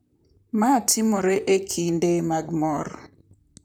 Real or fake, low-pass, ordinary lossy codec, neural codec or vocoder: fake; none; none; vocoder, 44.1 kHz, 128 mel bands, Pupu-Vocoder